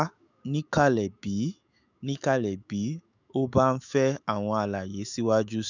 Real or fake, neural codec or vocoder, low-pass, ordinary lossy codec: fake; autoencoder, 48 kHz, 128 numbers a frame, DAC-VAE, trained on Japanese speech; 7.2 kHz; none